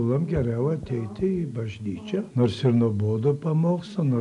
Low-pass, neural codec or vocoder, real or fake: 10.8 kHz; none; real